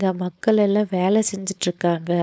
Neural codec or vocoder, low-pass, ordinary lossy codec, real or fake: codec, 16 kHz, 4.8 kbps, FACodec; none; none; fake